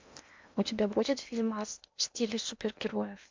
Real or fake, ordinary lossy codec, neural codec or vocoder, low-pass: fake; MP3, 64 kbps; codec, 16 kHz in and 24 kHz out, 0.8 kbps, FocalCodec, streaming, 65536 codes; 7.2 kHz